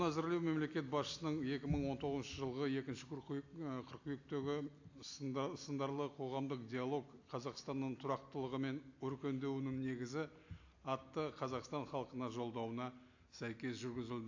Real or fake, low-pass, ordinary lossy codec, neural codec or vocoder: real; 7.2 kHz; AAC, 48 kbps; none